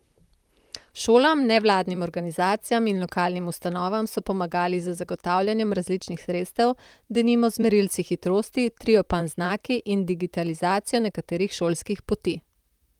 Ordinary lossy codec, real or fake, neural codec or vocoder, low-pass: Opus, 32 kbps; fake; vocoder, 44.1 kHz, 128 mel bands, Pupu-Vocoder; 19.8 kHz